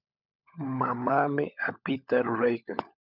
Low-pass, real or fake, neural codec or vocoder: 5.4 kHz; fake; codec, 16 kHz, 16 kbps, FunCodec, trained on LibriTTS, 50 frames a second